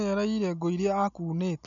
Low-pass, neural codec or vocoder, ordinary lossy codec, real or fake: 7.2 kHz; none; none; real